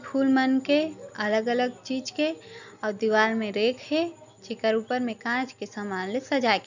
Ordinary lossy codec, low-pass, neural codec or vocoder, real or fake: none; 7.2 kHz; none; real